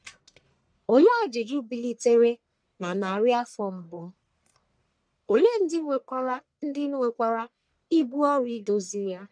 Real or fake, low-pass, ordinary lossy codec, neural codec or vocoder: fake; 9.9 kHz; none; codec, 44.1 kHz, 1.7 kbps, Pupu-Codec